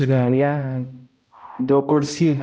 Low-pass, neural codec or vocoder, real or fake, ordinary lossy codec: none; codec, 16 kHz, 0.5 kbps, X-Codec, HuBERT features, trained on balanced general audio; fake; none